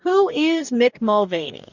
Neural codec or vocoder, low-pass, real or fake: codec, 44.1 kHz, 2.6 kbps, DAC; 7.2 kHz; fake